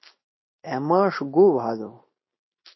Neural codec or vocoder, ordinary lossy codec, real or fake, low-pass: none; MP3, 24 kbps; real; 7.2 kHz